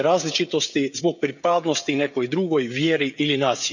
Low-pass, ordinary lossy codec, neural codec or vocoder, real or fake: 7.2 kHz; none; codec, 16 kHz, 16 kbps, FreqCodec, smaller model; fake